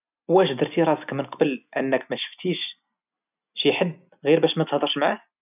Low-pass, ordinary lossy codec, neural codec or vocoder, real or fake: 3.6 kHz; none; none; real